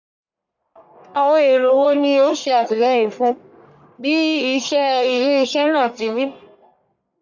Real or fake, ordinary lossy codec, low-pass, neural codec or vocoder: fake; none; 7.2 kHz; codec, 44.1 kHz, 1.7 kbps, Pupu-Codec